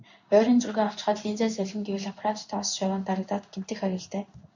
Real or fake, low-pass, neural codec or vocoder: fake; 7.2 kHz; codec, 16 kHz in and 24 kHz out, 1 kbps, XY-Tokenizer